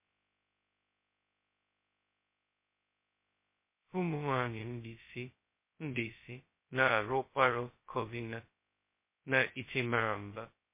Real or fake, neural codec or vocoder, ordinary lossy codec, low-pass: fake; codec, 16 kHz, 0.2 kbps, FocalCodec; MP3, 24 kbps; 3.6 kHz